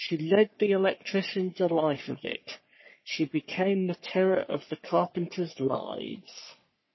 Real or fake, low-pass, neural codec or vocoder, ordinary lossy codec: fake; 7.2 kHz; codec, 44.1 kHz, 3.4 kbps, Pupu-Codec; MP3, 24 kbps